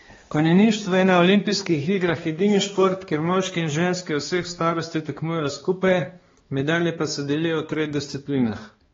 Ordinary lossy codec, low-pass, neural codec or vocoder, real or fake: AAC, 24 kbps; 7.2 kHz; codec, 16 kHz, 2 kbps, X-Codec, HuBERT features, trained on balanced general audio; fake